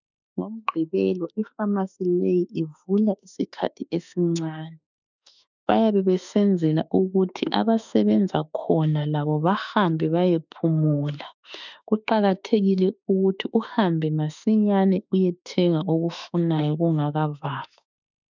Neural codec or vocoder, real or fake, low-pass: autoencoder, 48 kHz, 32 numbers a frame, DAC-VAE, trained on Japanese speech; fake; 7.2 kHz